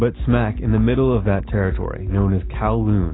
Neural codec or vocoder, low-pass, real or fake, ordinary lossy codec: none; 7.2 kHz; real; AAC, 16 kbps